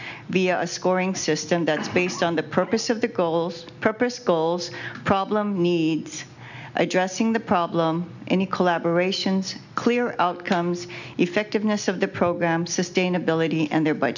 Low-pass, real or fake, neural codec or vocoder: 7.2 kHz; real; none